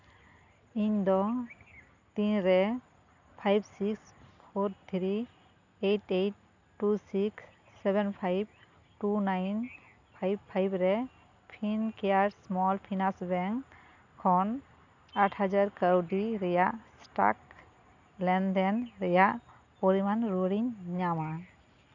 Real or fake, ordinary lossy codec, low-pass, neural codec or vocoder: real; none; 7.2 kHz; none